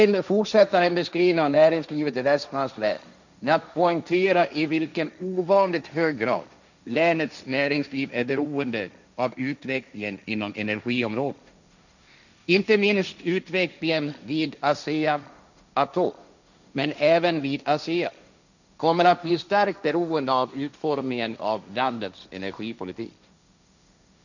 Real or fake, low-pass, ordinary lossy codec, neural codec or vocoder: fake; 7.2 kHz; none; codec, 16 kHz, 1.1 kbps, Voila-Tokenizer